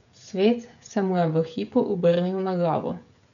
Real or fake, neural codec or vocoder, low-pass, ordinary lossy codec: fake; codec, 16 kHz, 16 kbps, FreqCodec, smaller model; 7.2 kHz; none